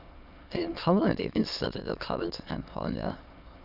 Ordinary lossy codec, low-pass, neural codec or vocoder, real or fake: none; 5.4 kHz; autoencoder, 22.05 kHz, a latent of 192 numbers a frame, VITS, trained on many speakers; fake